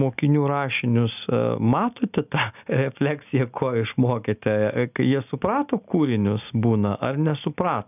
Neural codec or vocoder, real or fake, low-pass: none; real; 3.6 kHz